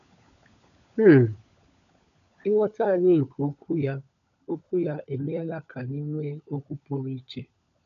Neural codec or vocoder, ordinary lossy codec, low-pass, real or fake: codec, 16 kHz, 16 kbps, FunCodec, trained on LibriTTS, 50 frames a second; none; 7.2 kHz; fake